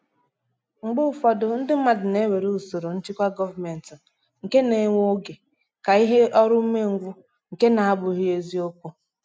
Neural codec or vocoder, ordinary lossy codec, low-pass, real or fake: none; none; none; real